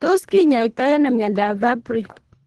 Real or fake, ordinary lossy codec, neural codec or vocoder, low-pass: fake; Opus, 16 kbps; codec, 24 kHz, 1.5 kbps, HILCodec; 10.8 kHz